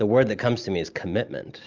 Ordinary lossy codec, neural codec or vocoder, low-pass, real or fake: Opus, 24 kbps; none; 7.2 kHz; real